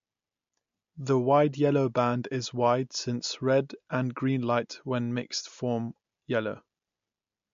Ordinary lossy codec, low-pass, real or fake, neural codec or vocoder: MP3, 48 kbps; 7.2 kHz; real; none